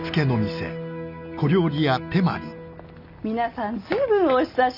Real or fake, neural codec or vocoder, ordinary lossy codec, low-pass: real; none; none; 5.4 kHz